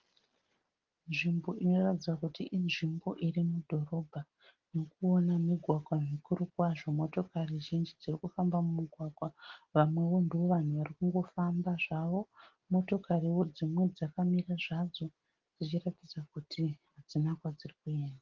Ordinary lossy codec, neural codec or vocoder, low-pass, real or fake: Opus, 16 kbps; none; 7.2 kHz; real